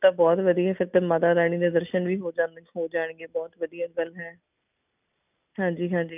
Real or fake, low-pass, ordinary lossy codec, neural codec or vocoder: real; 3.6 kHz; none; none